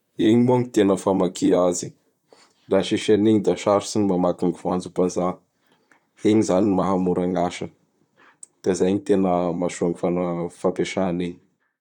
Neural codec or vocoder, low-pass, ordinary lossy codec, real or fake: vocoder, 44.1 kHz, 128 mel bands, Pupu-Vocoder; 19.8 kHz; none; fake